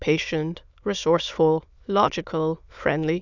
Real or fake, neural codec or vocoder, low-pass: fake; autoencoder, 22.05 kHz, a latent of 192 numbers a frame, VITS, trained on many speakers; 7.2 kHz